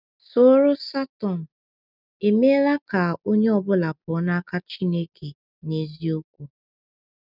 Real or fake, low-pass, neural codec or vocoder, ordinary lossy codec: real; 5.4 kHz; none; none